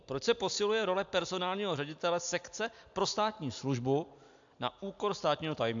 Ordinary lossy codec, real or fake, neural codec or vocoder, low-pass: AAC, 64 kbps; real; none; 7.2 kHz